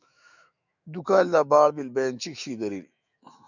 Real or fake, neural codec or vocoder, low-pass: fake; autoencoder, 48 kHz, 128 numbers a frame, DAC-VAE, trained on Japanese speech; 7.2 kHz